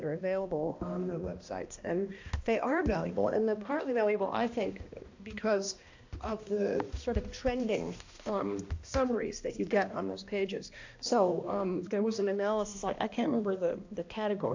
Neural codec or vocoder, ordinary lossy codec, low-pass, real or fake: codec, 16 kHz, 1 kbps, X-Codec, HuBERT features, trained on balanced general audio; AAC, 48 kbps; 7.2 kHz; fake